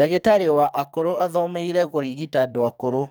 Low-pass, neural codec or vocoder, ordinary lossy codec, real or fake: none; codec, 44.1 kHz, 2.6 kbps, SNAC; none; fake